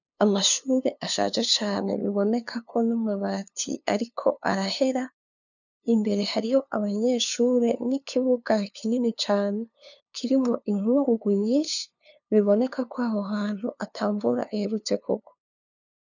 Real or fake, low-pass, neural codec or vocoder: fake; 7.2 kHz; codec, 16 kHz, 2 kbps, FunCodec, trained on LibriTTS, 25 frames a second